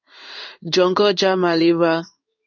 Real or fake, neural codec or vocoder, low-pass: fake; codec, 16 kHz in and 24 kHz out, 1 kbps, XY-Tokenizer; 7.2 kHz